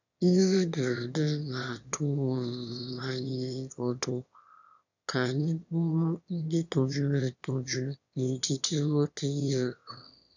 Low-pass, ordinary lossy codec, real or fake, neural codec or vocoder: 7.2 kHz; none; fake; autoencoder, 22.05 kHz, a latent of 192 numbers a frame, VITS, trained on one speaker